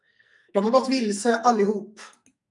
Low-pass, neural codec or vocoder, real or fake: 10.8 kHz; codec, 44.1 kHz, 2.6 kbps, SNAC; fake